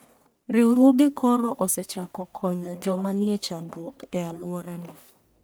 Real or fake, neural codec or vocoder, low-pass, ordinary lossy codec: fake; codec, 44.1 kHz, 1.7 kbps, Pupu-Codec; none; none